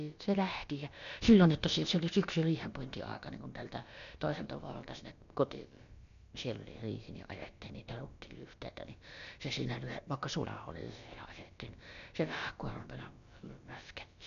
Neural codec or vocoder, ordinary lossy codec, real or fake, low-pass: codec, 16 kHz, about 1 kbps, DyCAST, with the encoder's durations; none; fake; 7.2 kHz